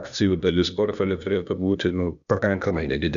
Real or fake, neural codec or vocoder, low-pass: fake; codec, 16 kHz, 0.8 kbps, ZipCodec; 7.2 kHz